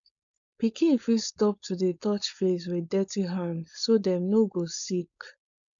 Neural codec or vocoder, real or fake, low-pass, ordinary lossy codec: codec, 16 kHz, 4.8 kbps, FACodec; fake; 7.2 kHz; none